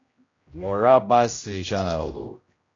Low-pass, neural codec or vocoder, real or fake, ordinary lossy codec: 7.2 kHz; codec, 16 kHz, 0.5 kbps, X-Codec, HuBERT features, trained on general audio; fake; AAC, 32 kbps